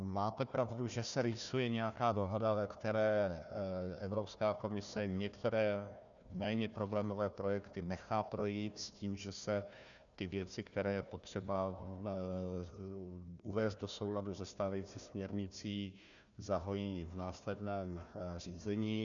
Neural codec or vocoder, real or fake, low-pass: codec, 16 kHz, 1 kbps, FunCodec, trained on Chinese and English, 50 frames a second; fake; 7.2 kHz